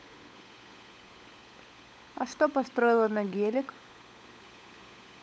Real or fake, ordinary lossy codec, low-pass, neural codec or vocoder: fake; none; none; codec, 16 kHz, 16 kbps, FunCodec, trained on LibriTTS, 50 frames a second